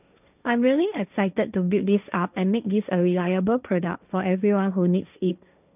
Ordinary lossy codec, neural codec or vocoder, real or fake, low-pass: none; codec, 16 kHz, 1.1 kbps, Voila-Tokenizer; fake; 3.6 kHz